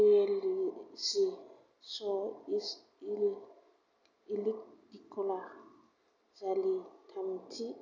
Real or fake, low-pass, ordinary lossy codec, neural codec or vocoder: real; 7.2 kHz; none; none